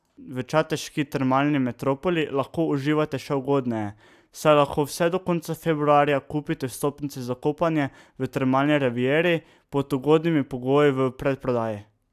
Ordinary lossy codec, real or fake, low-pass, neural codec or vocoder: AAC, 96 kbps; real; 14.4 kHz; none